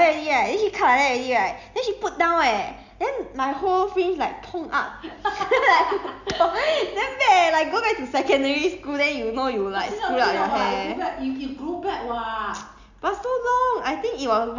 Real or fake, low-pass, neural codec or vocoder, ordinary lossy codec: real; 7.2 kHz; none; Opus, 64 kbps